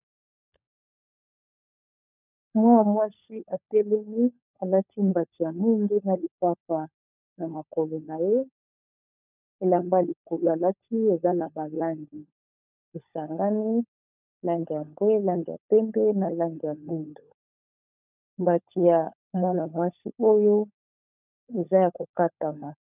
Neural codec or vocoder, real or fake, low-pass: codec, 16 kHz, 16 kbps, FunCodec, trained on LibriTTS, 50 frames a second; fake; 3.6 kHz